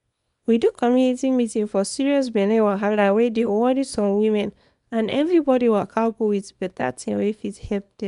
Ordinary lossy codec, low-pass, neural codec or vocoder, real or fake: none; 10.8 kHz; codec, 24 kHz, 0.9 kbps, WavTokenizer, small release; fake